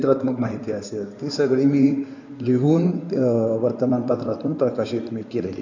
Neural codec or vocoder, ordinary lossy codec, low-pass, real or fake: codec, 16 kHz in and 24 kHz out, 2.2 kbps, FireRedTTS-2 codec; none; 7.2 kHz; fake